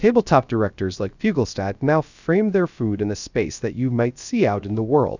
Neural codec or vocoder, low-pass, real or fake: codec, 16 kHz, 0.3 kbps, FocalCodec; 7.2 kHz; fake